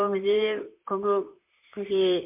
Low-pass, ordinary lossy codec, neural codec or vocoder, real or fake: 3.6 kHz; MP3, 32 kbps; vocoder, 44.1 kHz, 128 mel bands, Pupu-Vocoder; fake